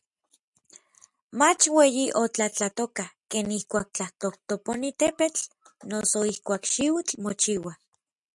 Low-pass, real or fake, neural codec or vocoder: 10.8 kHz; real; none